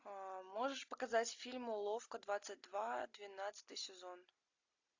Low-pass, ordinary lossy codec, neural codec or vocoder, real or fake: 7.2 kHz; AAC, 48 kbps; none; real